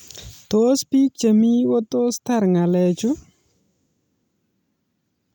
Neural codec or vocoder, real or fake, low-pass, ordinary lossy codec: none; real; 19.8 kHz; none